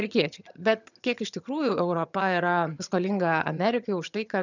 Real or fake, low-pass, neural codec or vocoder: fake; 7.2 kHz; vocoder, 22.05 kHz, 80 mel bands, HiFi-GAN